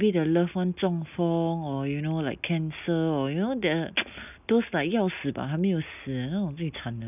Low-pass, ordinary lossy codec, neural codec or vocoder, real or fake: 3.6 kHz; none; none; real